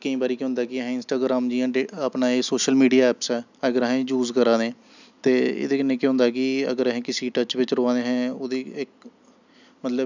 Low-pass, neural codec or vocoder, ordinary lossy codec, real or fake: 7.2 kHz; none; none; real